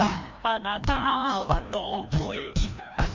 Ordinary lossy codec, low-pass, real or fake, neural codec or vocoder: MP3, 48 kbps; 7.2 kHz; fake; codec, 16 kHz, 1 kbps, FreqCodec, larger model